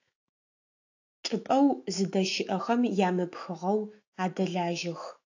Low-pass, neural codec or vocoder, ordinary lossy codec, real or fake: 7.2 kHz; autoencoder, 48 kHz, 128 numbers a frame, DAC-VAE, trained on Japanese speech; AAC, 48 kbps; fake